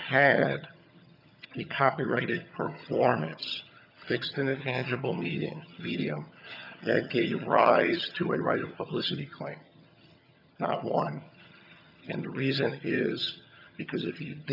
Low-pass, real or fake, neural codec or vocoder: 5.4 kHz; fake; vocoder, 22.05 kHz, 80 mel bands, HiFi-GAN